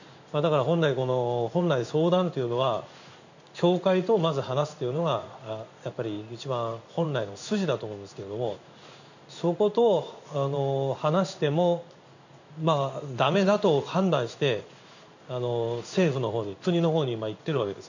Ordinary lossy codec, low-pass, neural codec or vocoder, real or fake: none; 7.2 kHz; codec, 16 kHz in and 24 kHz out, 1 kbps, XY-Tokenizer; fake